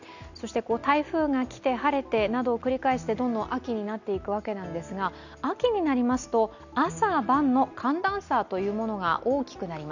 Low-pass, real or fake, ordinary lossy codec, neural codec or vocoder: 7.2 kHz; real; none; none